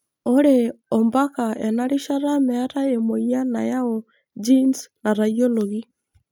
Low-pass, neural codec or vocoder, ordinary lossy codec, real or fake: none; none; none; real